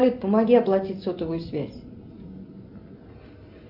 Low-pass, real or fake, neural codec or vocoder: 5.4 kHz; real; none